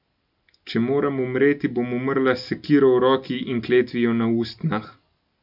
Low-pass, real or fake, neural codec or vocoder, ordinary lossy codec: 5.4 kHz; real; none; none